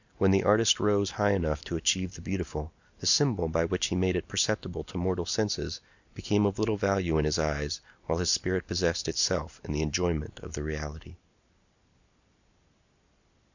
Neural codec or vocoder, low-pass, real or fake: none; 7.2 kHz; real